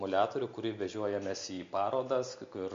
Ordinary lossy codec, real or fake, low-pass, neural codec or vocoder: MP3, 48 kbps; real; 7.2 kHz; none